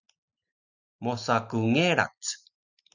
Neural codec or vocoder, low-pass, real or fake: none; 7.2 kHz; real